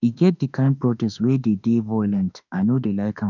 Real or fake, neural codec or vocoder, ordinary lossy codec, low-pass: fake; autoencoder, 48 kHz, 32 numbers a frame, DAC-VAE, trained on Japanese speech; none; 7.2 kHz